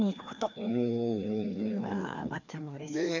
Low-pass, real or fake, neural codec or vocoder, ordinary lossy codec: 7.2 kHz; fake; codec, 16 kHz, 2 kbps, FreqCodec, larger model; none